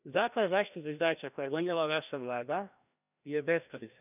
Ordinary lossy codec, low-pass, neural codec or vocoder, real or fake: none; 3.6 kHz; codec, 16 kHz, 1 kbps, FreqCodec, larger model; fake